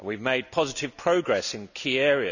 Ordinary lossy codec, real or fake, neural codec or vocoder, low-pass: none; real; none; 7.2 kHz